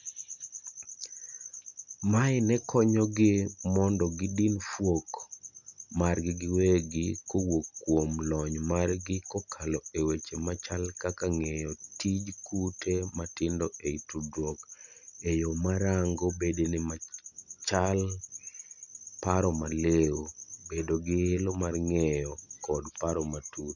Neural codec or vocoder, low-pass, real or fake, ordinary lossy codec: none; 7.2 kHz; real; none